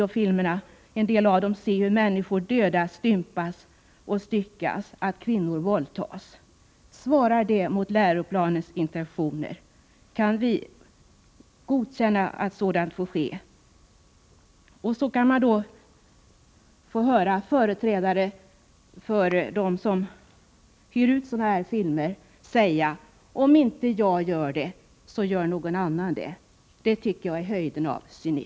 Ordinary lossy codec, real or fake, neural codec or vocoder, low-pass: none; real; none; none